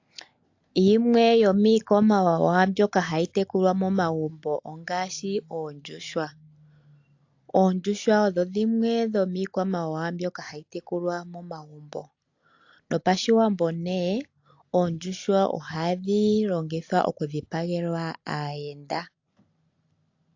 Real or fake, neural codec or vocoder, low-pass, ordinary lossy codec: real; none; 7.2 kHz; AAC, 48 kbps